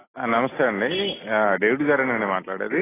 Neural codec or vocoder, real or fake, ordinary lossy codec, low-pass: none; real; AAC, 16 kbps; 3.6 kHz